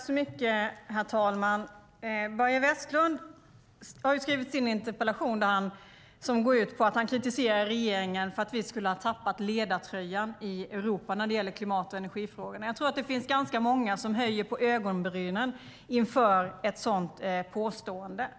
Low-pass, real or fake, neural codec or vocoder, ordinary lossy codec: none; real; none; none